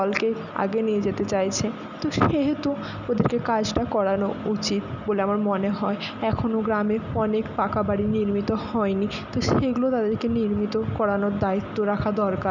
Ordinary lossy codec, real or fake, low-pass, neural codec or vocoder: none; real; 7.2 kHz; none